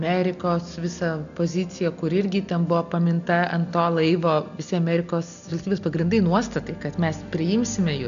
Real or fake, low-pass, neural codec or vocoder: real; 7.2 kHz; none